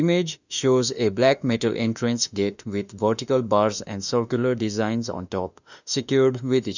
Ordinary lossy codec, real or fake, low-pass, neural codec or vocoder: none; fake; 7.2 kHz; autoencoder, 48 kHz, 32 numbers a frame, DAC-VAE, trained on Japanese speech